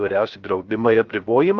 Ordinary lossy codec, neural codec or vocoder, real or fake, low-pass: Opus, 24 kbps; codec, 16 kHz, 0.3 kbps, FocalCodec; fake; 7.2 kHz